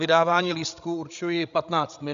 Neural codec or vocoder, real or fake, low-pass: codec, 16 kHz, 16 kbps, FreqCodec, larger model; fake; 7.2 kHz